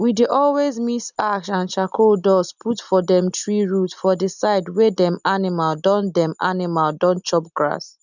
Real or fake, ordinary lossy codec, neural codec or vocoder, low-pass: real; none; none; 7.2 kHz